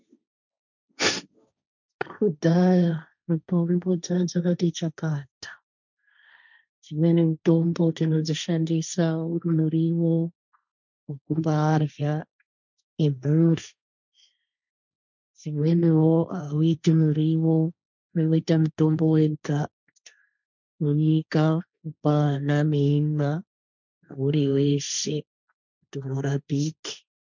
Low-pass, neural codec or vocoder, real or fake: 7.2 kHz; codec, 16 kHz, 1.1 kbps, Voila-Tokenizer; fake